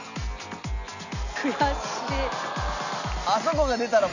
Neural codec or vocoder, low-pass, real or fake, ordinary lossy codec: none; 7.2 kHz; real; none